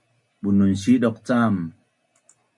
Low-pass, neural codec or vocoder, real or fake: 10.8 kHz; none; real